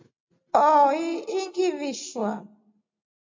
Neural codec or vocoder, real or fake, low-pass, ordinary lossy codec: none; real; 7.2 kHz; MP3, 48 kbps